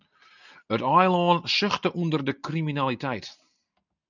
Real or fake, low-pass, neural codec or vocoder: real; 7.2 kHz; none